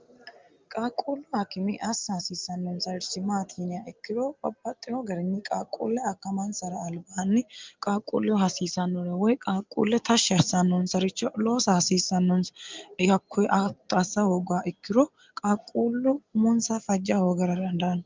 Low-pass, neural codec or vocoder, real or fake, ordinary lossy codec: 7.2 kHz; none; real; Opus, 32 kbps